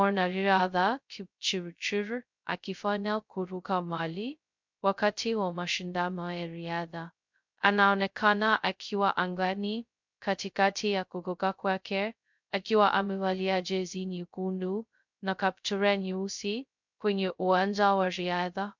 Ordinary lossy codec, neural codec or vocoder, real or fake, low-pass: MP3, 64 kbps; codec, 16 kHz, 0.2 kbps, FocalCodec; fake; 7.2 kHz